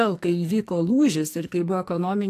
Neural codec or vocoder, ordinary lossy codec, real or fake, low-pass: codec, 44.1 kHz, 2.6 kbps, SNAC; AAC, 64 kbps; fake; 14.4 kHz